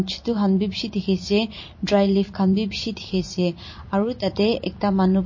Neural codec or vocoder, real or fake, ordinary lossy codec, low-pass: vocoder, 44.1 kHz, 128 mel bands every 256 samples, BigVGAN v2; fake; MP3, 32 kbps; 7.2 kHz